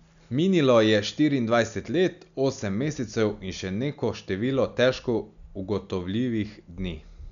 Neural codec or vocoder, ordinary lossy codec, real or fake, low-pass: none; none; real; 7.2 kHz